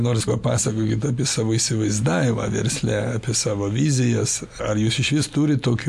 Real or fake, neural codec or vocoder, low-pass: real; none; 14.4 kHz